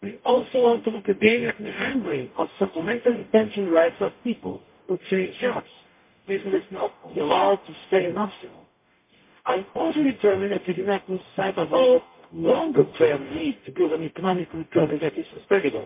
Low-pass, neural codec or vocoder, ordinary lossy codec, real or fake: 3.6 kHz; codec, 44.1 kHz, 0.9 kbps, DAC; MP3, 24 kbps; fake